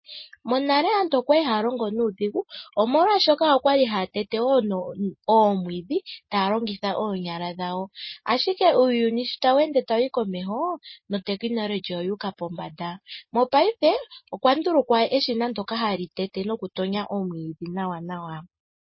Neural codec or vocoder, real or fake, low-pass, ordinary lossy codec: none; real; 7.2 kHz; MP3, 24 kbps